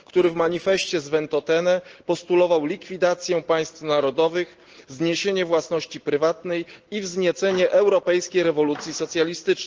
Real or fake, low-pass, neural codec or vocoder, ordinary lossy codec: real; 7.2 kHz; none; Opus, 16 kbps